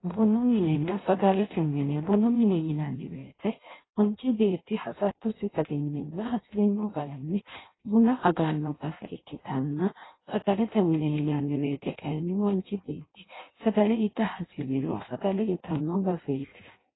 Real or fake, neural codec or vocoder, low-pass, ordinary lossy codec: fake; codec, 16 kHz in and 24 kHz out, 0.6 kbps, FireRedTTS-2 codec; 7.2 kHz; AAC, 16 kbps